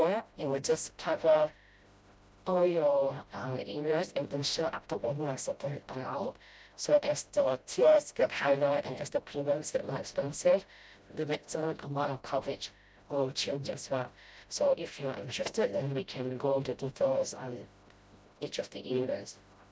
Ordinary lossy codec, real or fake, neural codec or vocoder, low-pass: none; fake; codec, 16 kHz, 0.5 kbps, FreqCodec, smaller model; none